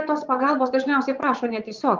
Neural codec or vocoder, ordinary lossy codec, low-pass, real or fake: vocoder, 22.05 kHz, 80 mel bands, Vocos; Opus, 24 kbps; 7.2 kHz; fake